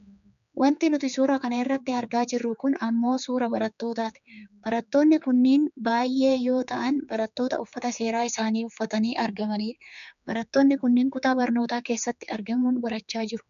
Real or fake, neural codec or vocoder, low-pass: fake; codec, 16 kHz, 4 kbps, X-Codec, HuBERT features, trained on general audio; 7.2 kHz